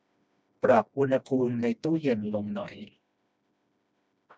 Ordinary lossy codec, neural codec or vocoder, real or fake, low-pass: none; codec, 16 kHz, 1 kbps, FreqCodec, smaller model; fake; none